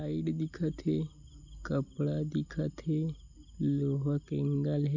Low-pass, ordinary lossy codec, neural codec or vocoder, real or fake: 7.2 kHz; none; none; real